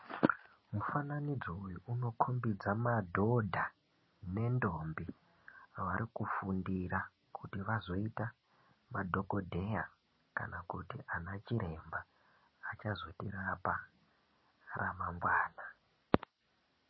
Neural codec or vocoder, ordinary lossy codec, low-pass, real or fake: none; MP3, 24 kbps; 7.2 kHz; real